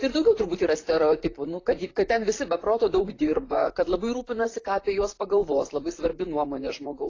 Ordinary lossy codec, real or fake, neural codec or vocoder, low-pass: AAC, 32 kbps; fake; vocoder, 44.1 kHz, 128 mel bands, Pupu-Vocoder; 7.2 kHz